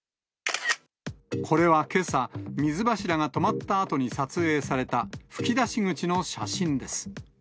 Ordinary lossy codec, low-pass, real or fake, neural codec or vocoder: none; none; real; none